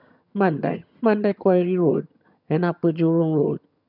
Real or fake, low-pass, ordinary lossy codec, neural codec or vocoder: fake; 5.4 kHz; none; vocoder, 22.05 kHz, 80 mel bands, HiFi-GAN